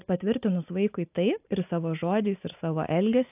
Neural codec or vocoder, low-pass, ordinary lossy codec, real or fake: none; 3.6 kHz; AAC, 32 kbps; real